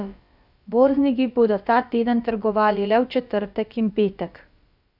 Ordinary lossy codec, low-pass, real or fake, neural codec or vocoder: Opus, 64 kbps; 5.4 kHz; fake; codec, 16 kHz, about 1 kbps, DyCAST, with the encoder's durations